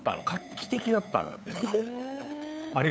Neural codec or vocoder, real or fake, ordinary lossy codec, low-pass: codec, 16 kHz, 8 kbps, FunCodec, trained on LibriTTS, 25 frames a second; fake; none; none